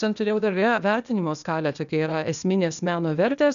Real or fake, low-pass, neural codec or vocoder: fake; 7.2 kHz; codec, 16 kHz, 0.8 kbps, ZipCodec